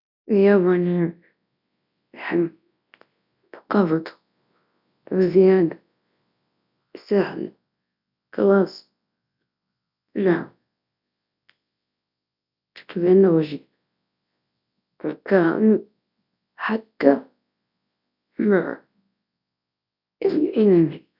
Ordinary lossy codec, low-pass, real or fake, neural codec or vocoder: none; 5.4 kHz; fake; codec, 24 kHz, 0.9 kbps, WavTokenizer, large speech release